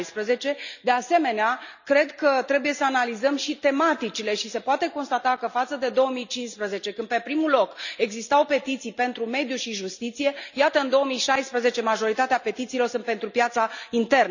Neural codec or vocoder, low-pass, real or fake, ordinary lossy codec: none; 7.2 kHz; real; none